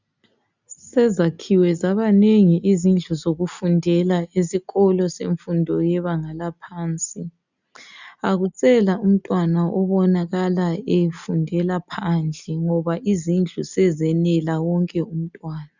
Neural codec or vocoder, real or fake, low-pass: none; real; 7.2 kHz